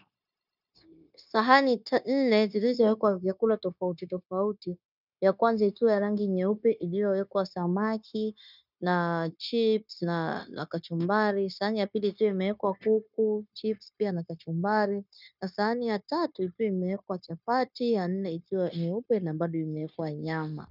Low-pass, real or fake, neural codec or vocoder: 5.4 kHz; fake; codec, 16 kHz, 0.9 kbps, LongCat-Audio-Codec